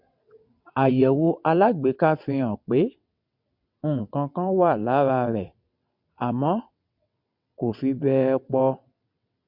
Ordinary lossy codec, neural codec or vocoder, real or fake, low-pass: MP3, 48 kbps; vocoder, 22.05 kHz, 80 mel bands, WaveNeXt; fake; 5.4 kHz